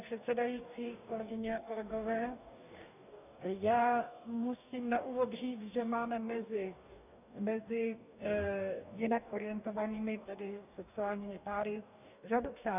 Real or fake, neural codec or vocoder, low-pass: fake; codec, 44.1 kHz, 2.6 kbps, DAC; 3.6 kHz